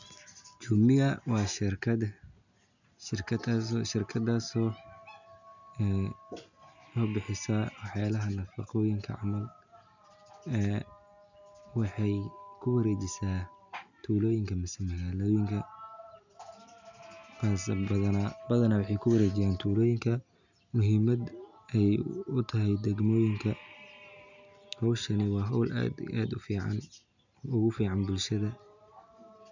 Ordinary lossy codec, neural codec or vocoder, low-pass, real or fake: none; none; 7.2 kHz; real